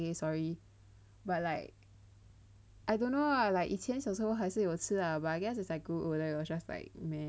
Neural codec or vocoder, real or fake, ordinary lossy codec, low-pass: none; real; none; none